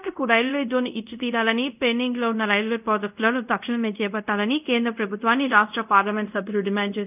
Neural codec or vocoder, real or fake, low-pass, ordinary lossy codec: codec, 24 kHz, 0.5 kbps, DualCodec; fake; 3.6 kHz; none